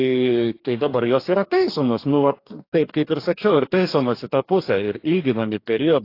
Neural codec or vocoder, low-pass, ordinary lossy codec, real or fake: codec, 44.1 kHz, 2.6 kbps, DAC; 5.4 kHz; AAC, 32 kbps; fake